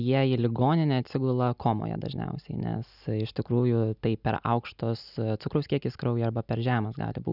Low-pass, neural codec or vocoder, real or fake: 5.4 kHz; none; real